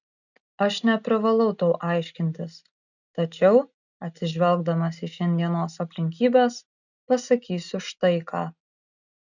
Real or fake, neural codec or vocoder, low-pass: real; none; 7.2 kHz